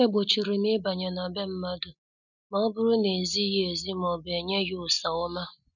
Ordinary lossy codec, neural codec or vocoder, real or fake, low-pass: none; none; real; 7.2 kHz